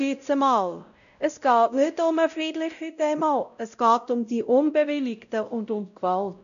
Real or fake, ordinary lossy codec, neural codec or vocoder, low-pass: fake; none; codec, 16 kHz, 0.5 kbps, X-Codec, WavLM features, trained on Multilingual LibriSpeech; 7.2 kHz